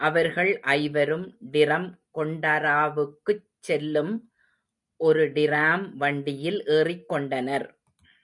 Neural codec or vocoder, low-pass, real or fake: none; 10.8 kHz; real